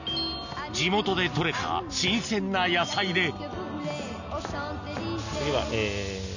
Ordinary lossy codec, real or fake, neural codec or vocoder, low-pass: none; real; none; 7.2 kHz